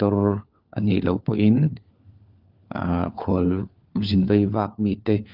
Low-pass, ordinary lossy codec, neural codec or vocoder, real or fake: 5.4 kHz; Opus, 32 kbps; codec, 16 kHz, 4 kbps, FunCodec, trained on LibriTTS, 50 frames a second; fake